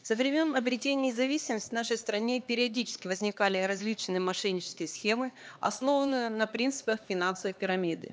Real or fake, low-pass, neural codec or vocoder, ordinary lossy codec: fake; none; codec, 16 kHz, 2 kbps, X-Codec, HuBERT features, trained on LibriSpeech; none